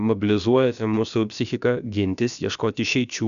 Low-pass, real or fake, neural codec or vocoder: 7.2 kHz; fake; codec, 16 kHz, about 1 kbps, DyCAST, with the encoder's durations